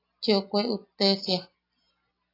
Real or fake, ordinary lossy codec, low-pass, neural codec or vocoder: real; AAC, 32 kbps; 5.4 kHz; none